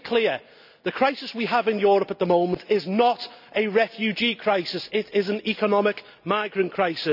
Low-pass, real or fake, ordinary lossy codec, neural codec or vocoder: 5.4 kHz; real; none; none